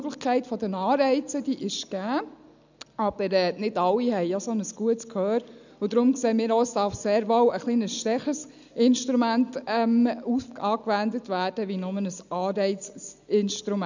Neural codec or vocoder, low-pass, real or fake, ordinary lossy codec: none; 7.2 kHz; real; none